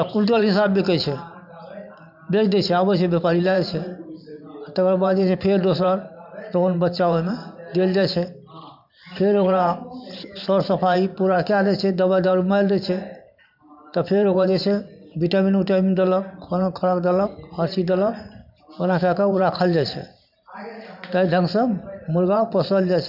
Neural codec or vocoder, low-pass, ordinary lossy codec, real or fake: vocoder, 44.1 kHz, 128 mel bands every 512 samples, BigVGAN v2; 5.4 kHz; none; fake